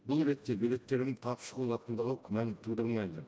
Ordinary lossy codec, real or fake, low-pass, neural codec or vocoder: none; fake; none; codec, 16 kHz, 1 kbps, FreqCodec, smaller model